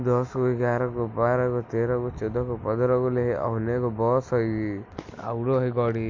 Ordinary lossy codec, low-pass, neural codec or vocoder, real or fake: MP3, 48 kbps; 7.2 kHz; none; real